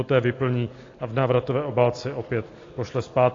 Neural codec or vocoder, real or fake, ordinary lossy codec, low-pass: none; real; AAC, 32 kbps; 7.2 kHz